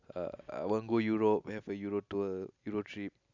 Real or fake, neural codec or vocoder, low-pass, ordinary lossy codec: real; none; 7.2 kHz; none